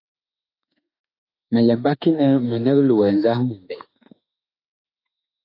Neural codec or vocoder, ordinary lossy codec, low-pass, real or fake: autoencoder, 48 kHz, 32 numbers a frame, DAC-VAE, trained on Japanese speech; AAC, 32 kbps; 5.4 kHz; fake